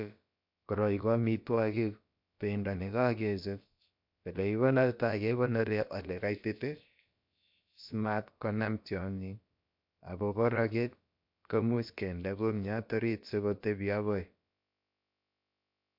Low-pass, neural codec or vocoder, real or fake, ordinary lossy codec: 5.4 kHz; codec, 16 kHz, about 1 kbps, DyCAST, with the encoder's durations; fake; MP3, 48 kbps